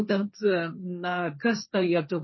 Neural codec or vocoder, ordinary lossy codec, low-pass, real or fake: codec, 16 kHz, 1.1 kbps, Voila-Tokenizer; MP3, 24 kbps; 7.2 kHz; fake